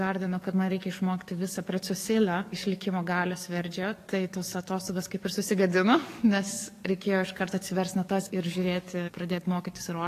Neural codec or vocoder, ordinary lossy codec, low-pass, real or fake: codec, 44.1 kHz, 7.8 kbps, DAC; AAC, 48 kbps; 14.4 kHz; fake